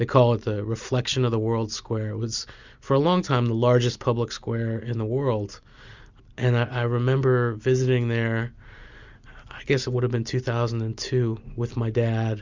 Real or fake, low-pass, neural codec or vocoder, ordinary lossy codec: real; 7.2 kHz; none; Opus, 64 kbps